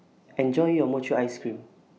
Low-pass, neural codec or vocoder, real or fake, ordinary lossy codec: none; none; real; none